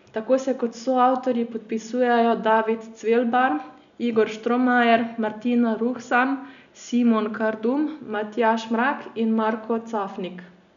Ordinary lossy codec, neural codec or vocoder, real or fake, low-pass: none; none; real; 7.2 kHz